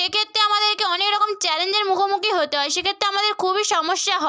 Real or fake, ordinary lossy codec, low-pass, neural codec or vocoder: real; none; none; none